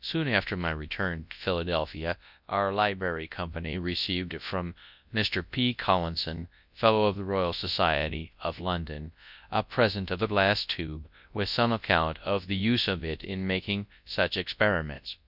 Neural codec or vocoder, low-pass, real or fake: codec, 24 kHz, 0.9 kbps, WavTokenizer, large speech release; 5.4 kHz; fake